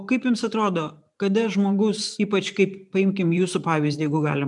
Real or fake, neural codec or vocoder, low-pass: fake; vocoder, 44.1 kHz, 128 mel bands every 512 samples, BigVGAN v2; 10.8 kHz